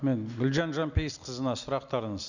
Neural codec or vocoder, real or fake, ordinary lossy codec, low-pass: none; real; none; 7.2 kHz